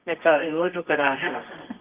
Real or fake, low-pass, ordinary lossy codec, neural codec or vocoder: fake; 3.6 kHz; Opus, 24 kbps; codec, 24 kHz, 0.9 kbps, WavTokenizer, medium music audio release